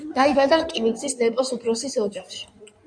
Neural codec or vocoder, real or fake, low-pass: codec, 16 kHz in and 24 kHz out, 2.2 kbps, FireRedTTS-2 codec; fake; 9.9 kHz